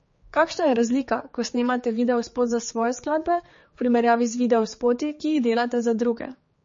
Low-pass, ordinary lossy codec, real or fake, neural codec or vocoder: 7.2 kHz; MP3, 32 kbps; fake; codec, 16 kHz, 4 kbps, X-Codec, HuBERT features, trained on general audio